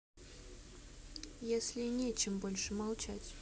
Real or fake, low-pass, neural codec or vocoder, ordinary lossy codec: real; none; none; none